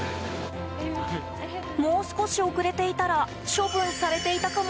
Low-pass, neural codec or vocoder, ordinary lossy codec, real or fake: none; none; none; real